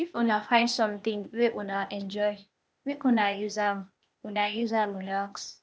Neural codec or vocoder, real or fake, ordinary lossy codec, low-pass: codec, 16 kHz, 0.8 kbps, ZipCodec; fake; none; none